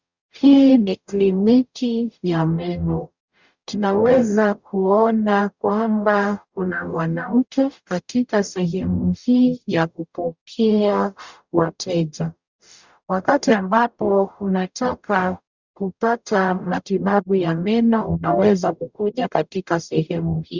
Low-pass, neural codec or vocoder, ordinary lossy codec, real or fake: 7.2 kHz; codec, 44.1 kHz, 0.9 kbps, DAC; Opus, 64 kbps; fake